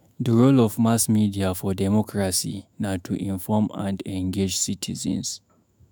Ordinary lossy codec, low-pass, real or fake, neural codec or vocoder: none; none; fake; autoencoder, 48 kHz, 128 numbers a frame, DAC-VAE, trained on Japanese speech